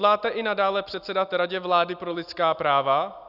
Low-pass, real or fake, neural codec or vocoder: 5.4 kHz; real; none